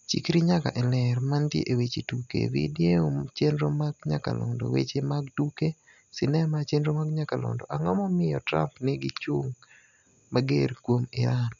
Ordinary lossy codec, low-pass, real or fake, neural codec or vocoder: none; 7.2 kHz; real; none